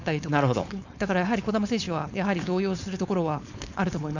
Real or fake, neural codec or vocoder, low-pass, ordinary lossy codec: fake; codec, 16 kHz, 4.8 kbps, FACodec; 7.2 kHz; none